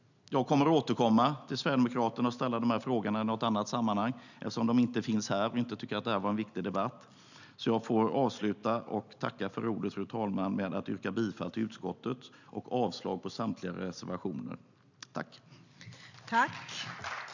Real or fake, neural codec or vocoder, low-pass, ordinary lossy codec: real; none; 7.2 kHz; none